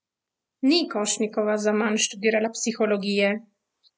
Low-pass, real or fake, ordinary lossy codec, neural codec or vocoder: none; real; none; none